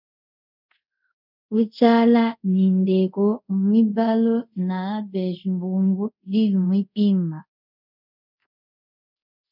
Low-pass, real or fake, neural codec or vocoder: 5.4 kHz; fake; codec, 24 kHz, 0.5 kbps, DualCodec